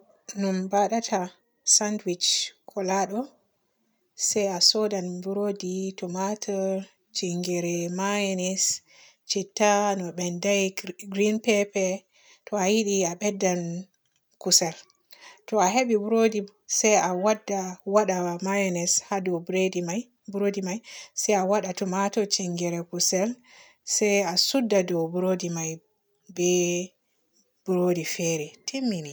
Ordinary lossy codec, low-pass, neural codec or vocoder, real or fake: none; none; none; real